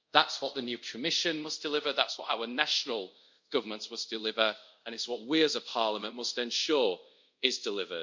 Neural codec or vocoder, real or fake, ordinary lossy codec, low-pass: codec, 24 kHz, 0.5 kbps, DualCodec; fake; MP3, 48 kbps; 7.2 kHz